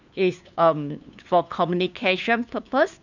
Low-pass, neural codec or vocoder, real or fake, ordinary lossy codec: 7.2 kHz; codec, 16 kHz, 2 kbps, FunCodec, trained on LibriTTS, 25 frames a second; fake; none